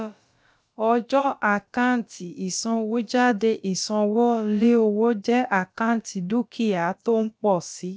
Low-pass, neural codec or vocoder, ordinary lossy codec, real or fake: none; codec, 16 kHz, about 1 kbps, DyCAST, with the encoder's durations; none; fake